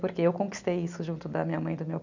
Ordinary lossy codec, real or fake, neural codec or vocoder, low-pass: none; real; none; 7.2 kHz